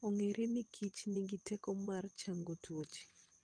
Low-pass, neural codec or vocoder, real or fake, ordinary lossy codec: 9.9 kHz; vocoder, 22.05 kHz, 80 mel bands, WaveNeXt; fake; Opus, 32 kbps